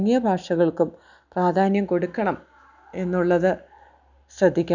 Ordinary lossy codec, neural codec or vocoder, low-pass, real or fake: none; none; 7.2 kHz; real